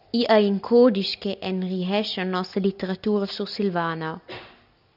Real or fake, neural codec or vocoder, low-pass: real; none; 5.4 kHz